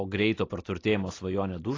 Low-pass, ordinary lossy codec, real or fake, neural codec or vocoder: 7.2 kHz; AAC, 32 kbps; real; none